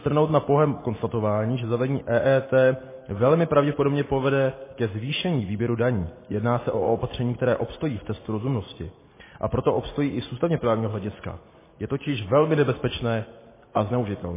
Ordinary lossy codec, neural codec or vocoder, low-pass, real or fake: MP3, 16 kbps; none; 3.6 kHz; real